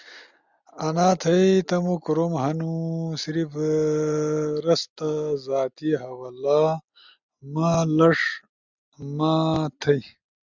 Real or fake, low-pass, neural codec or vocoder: real; 7.2 kHz; none